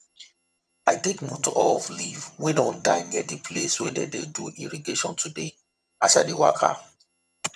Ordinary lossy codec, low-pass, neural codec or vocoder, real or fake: none; none; vocoder, 22.05 kHz, 80 mel bands, HiFi-GAN; fake